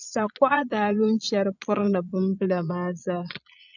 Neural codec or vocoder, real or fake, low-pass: vocoder, 22.05 kHz, 80 mel bands, Vocos; fake; 7.2 kHz